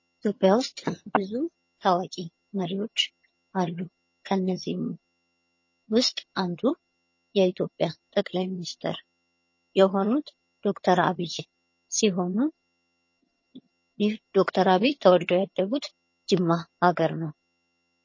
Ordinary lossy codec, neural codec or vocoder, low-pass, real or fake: MP3, 32 kbps; vocoder, 22.05 kHz, 80 mel bands, HiFi-GAN; 7.2 kHz; fake